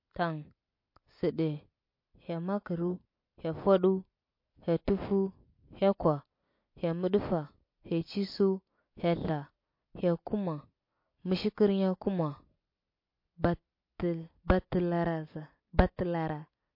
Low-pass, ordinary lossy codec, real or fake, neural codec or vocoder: 5.4 kHz; MP3, 32 kbps; real; none